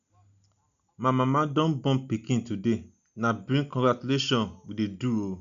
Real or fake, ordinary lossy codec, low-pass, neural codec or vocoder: real; none; 7.2 kHz; none